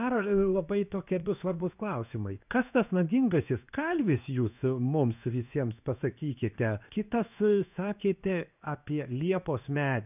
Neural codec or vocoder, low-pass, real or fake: codec, 16 kHz in and 24 kHz out, 1 kbps, XY-Tokenizer; 3.6 kHz; fake